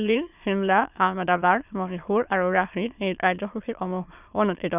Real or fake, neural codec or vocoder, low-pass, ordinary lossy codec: fake; autoencoder, 22.05 kHz, a latent of 192 numbers a frame, VITS, trained on many speakers; 3.6 kHz; none